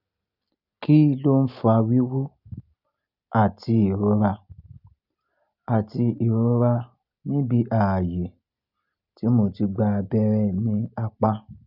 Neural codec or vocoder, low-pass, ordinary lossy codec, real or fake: none; 5.4 kHz; none; real